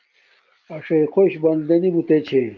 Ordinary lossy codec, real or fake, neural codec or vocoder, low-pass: Opus, 32 kbps; real; none; 7.2 kHz